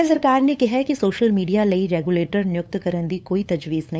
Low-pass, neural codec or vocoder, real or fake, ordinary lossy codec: none; codec, 16 kHz, 8 kbps, FunCodec, trained on LibriTTS, 25 frames a second; fake; none